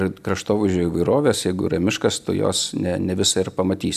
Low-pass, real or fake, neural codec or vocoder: 14.4 kHz; real; none